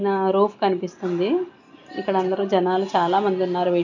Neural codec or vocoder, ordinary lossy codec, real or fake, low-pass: none; none; real; 7.2 kHz